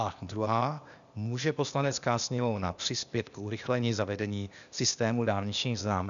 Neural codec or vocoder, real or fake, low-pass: codec, 16 kHz, 0.8 kbps, ZipCodec; fake; 7.2 kHz